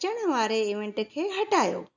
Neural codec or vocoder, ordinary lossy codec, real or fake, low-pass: none; none; real; 7.2 kHz